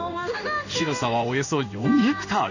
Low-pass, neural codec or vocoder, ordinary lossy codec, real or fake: 7.2 kHz; codec, 16 kHz in and 24 kHz out, 1 kbps, XY-Tokenizer; none; fake